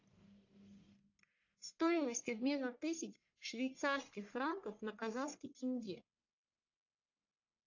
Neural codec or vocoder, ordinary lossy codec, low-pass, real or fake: codec, 44.1 kHz, 1.7 kbps, Pupu-Codec; AAC, 48 kbps; 7.2 kHz; fake